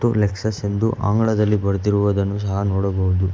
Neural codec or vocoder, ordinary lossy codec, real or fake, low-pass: none; none; real; none